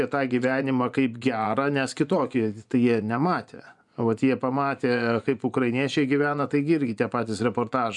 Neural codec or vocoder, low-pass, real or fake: vocoder, 24 kHz, 100 mel bands, Vocos; 10.8 kHz; fake